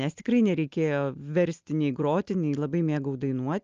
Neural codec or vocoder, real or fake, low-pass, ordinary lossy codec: none; real; 7.2 kHz; Opus, 24 kbps